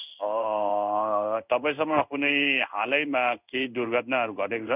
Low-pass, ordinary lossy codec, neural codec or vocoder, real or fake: 3.6 kHz; none; codec, 16 kHz in and 24 kHz out, 1 kbps, XY-Tokenizer; fake